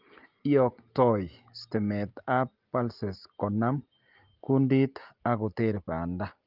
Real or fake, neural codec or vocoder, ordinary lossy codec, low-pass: real; none; Opus, 24 kbps; 5.4 kHz